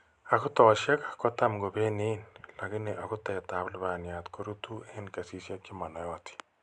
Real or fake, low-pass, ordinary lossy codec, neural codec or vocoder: real; 9.9 kHz; none; none